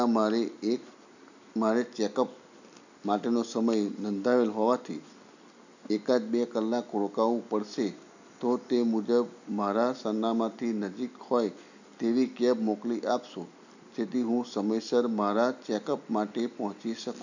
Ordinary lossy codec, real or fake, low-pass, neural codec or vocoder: none; real; 7.2 kHz; none